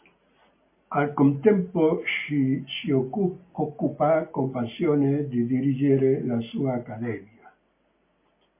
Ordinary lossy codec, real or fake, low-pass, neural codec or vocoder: MP3, 24 kbps; real; 3.6 kHz; none